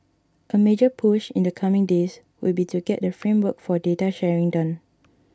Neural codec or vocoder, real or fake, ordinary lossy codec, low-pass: none; real; none; none